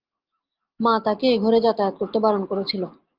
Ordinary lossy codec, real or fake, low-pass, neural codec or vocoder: Opus, 32 kbps; real; 5.4 kHz; none